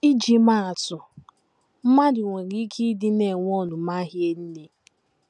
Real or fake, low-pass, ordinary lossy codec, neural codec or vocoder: real; none; none; none